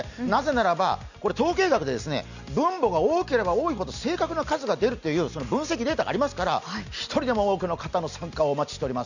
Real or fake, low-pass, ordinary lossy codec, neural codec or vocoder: real; 7.2 kHz; none; none